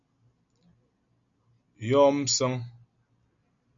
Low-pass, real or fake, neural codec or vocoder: 7.2 kHz; real; none